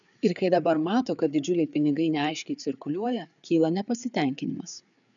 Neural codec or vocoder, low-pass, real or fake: codec, 16 kHz, 4 kbps, FreqCodec, larger model; 7.2 kHz; fake